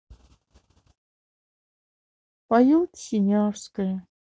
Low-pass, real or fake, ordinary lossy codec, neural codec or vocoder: none; real; none; none